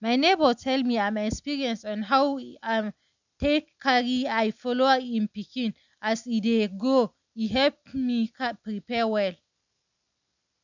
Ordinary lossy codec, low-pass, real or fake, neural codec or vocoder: none; 7.2 kHz; real; none